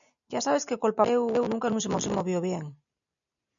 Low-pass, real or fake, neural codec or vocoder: 7.2 kHz; real; none